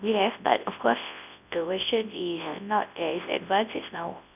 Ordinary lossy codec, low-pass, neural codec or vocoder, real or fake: none; 3.6 kHz; codec, 24 kHz, 0.9 kbps, WavTokenizer, large speech release; fake